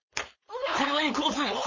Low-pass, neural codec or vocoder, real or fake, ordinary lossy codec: 7.2 kHz; codec, 16 kHz, 4.8 kbps, FACodec; fake; MP3, 32 kbps